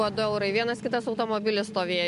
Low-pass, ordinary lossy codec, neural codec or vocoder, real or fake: 10.8 kHz; MP3, 64 kbps; none; real